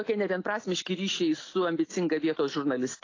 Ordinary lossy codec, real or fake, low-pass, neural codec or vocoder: AAC, 32 kbps; real; 7.2 kHz; none